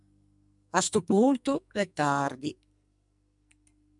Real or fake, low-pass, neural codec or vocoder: fake; 10.8 kHz; codec, 44.1 kHz, 2.6 kbps, SNAC